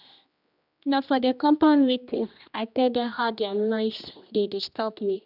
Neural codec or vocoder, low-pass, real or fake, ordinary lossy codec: codec, 16 kHz, 1 kbps, X-Codec, HuBERT features, trained on general audio; 5.4 kHz; fake; none